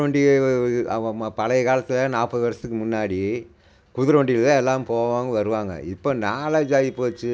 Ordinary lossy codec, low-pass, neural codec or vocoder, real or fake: none; none; none; real